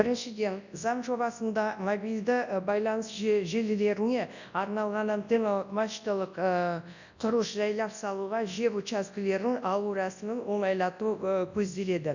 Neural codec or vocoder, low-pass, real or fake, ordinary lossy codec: codec, 24 kHz, 0.9 kbps, WavTokenizer, large speech release; 7.2 kHz; fake; none